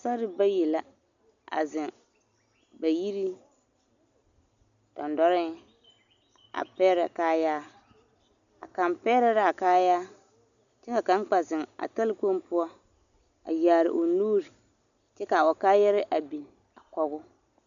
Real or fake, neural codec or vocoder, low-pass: real; none; 7.2 kHz